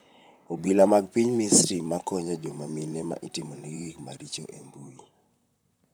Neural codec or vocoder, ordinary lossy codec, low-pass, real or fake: vocoder, 44.1 kHz, 128 mel bands, Pupu-Vocoder; none; none; fake